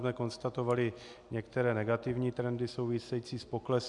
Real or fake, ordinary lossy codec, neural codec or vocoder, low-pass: real; MP3, 96 kbps; none; 10.8 kHz